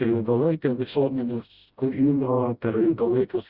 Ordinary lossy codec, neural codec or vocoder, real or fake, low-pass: Opus, 64 kbps; codec, 16 kHz, 0.5 kbps, FreqCodec, smaller model; fake; 5.4 kHz